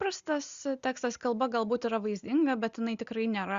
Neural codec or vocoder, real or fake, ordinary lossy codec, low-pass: none; real; Opus, 64 kbps; 7.2 kHz